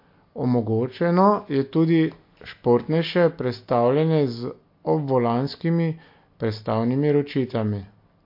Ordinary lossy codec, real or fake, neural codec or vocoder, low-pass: MP3, 32 kbps; real; none; 5.4 kHz